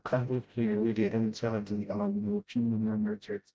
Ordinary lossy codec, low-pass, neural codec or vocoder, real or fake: none; none; codec, 16 kHz, 0.5 kbps, FreqCodec, smaller model; fake